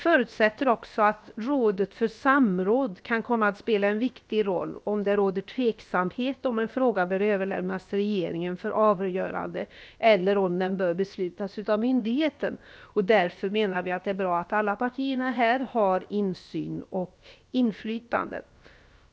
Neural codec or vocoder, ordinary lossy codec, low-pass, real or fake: codec, 16 kHz, about 1 kbps, DyCAST, with the encoder's durations; none; none; fake